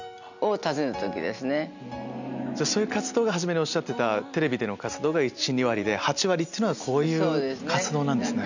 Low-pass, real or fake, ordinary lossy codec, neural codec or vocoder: 7.2 kHz; real; none; none